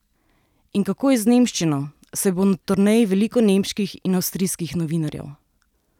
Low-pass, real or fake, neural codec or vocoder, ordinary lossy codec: 19.8 kHz; real; none; none